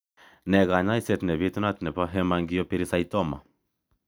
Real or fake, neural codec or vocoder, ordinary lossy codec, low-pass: real; none; none; none